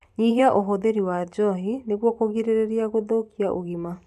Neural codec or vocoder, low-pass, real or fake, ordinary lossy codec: vocoder, 44.1 kHz, 128 mel bands every 512 samples, BigVGAN v2; 14.4 kHz; fake; MP3, 96 kbps